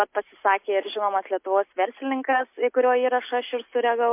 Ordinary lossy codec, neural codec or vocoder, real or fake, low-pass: MP3, 32 kbps; none; real; 3.6 kHz